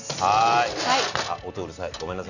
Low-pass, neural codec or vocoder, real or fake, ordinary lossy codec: 7.2 kHz; none; real; none